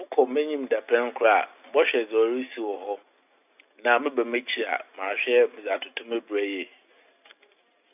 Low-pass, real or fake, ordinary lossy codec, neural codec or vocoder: 3.6 kHz; real; none; none